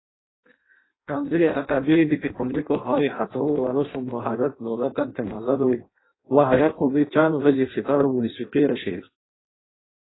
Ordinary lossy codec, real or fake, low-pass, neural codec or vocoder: AAC, 16 kbps; fake; 7.2 kHz; codec, 16 kHz in and 24 kHz out, 0.6 kbps, FireRedTTS-2 codec